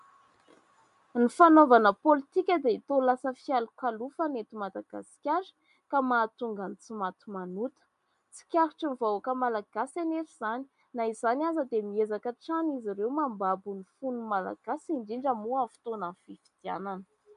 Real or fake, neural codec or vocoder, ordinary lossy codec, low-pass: real; none; MP3, 64 kbps; 10.8 kHz